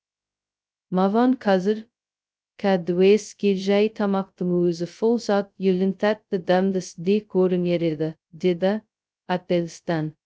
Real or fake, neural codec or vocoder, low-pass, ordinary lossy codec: fake; codec, 16 kHz, 0.2 kbps, FocalCodec; none; none